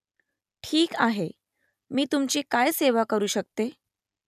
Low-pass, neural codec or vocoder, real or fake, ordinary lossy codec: 14.4 kHz; none; real; none